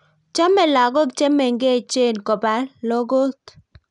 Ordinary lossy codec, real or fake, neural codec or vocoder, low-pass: none; real; none; 10.8 kHz